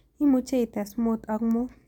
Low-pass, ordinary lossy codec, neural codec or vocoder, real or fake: 19.8 kHz; none; none; real